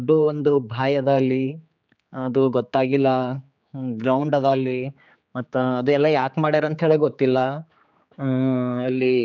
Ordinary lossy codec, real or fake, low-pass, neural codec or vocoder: none; fake; 7.2 kHz; codec, 16 kHz, 4 kbps, X-Codec, HuBERT features, trained on general audio